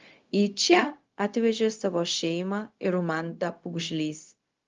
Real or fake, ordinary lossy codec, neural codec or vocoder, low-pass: fake; Opus, 32 kbps; codec, 16 kHz, 0.4 kbps, LongCat-Audio-Codec; 7.2 kHz